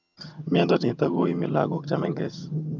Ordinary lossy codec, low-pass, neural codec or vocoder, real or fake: none; 7.2 kHz; vocoder, 22.05 kHz, 80 mel bands, HiFi-GAN; fake